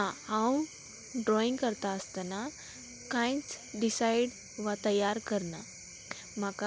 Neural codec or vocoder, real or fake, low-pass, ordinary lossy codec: none; real; none; none